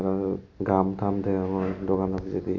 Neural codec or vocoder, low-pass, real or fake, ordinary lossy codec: none; 7.2 kHz; real; none